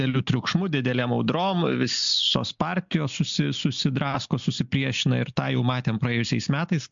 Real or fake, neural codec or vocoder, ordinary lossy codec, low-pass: real; none; MP3, 64 kbps; 7.2 kHz